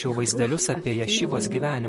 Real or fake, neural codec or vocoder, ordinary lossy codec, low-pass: fake; vocoder, 44.1 kHz, 128 mel bands, Pupu-Vocoder; MP3, 48 kbps; 14.4 kHz